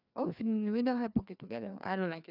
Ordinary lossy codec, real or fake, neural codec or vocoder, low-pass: none; fake; codec, 16 kHz, 2 kbps, FreqCodec, larger model; 5.4 kHz